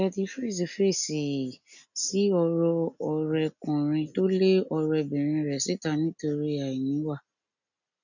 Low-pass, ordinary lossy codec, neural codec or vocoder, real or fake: 7.2 kHz; none; none; real